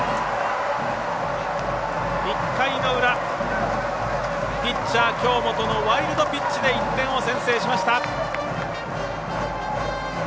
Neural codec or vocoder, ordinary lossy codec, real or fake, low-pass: none; none; real; none